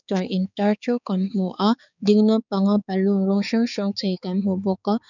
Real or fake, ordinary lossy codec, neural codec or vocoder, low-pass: fake; none; codec, 16 kHz, 4 kbps, X-Codec, HuBERT features, trained on balanced general audio; 7.2 kHz